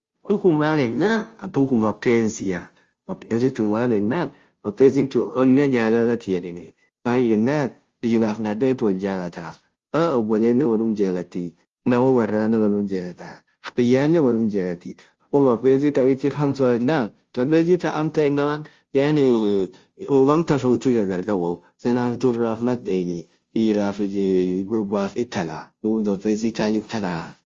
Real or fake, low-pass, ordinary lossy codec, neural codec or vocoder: fake; 7.2 kHz; Opus, 32 kbps; codec, 16 kHz, 0.5 kbps, FunCodec, trained on Chinese and English, 25 frames a second